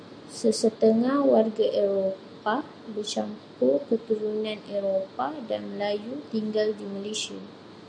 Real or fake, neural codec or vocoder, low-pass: real; none; 9.9 kHz